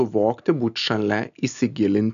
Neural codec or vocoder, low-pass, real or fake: none; 7.2 kHz; real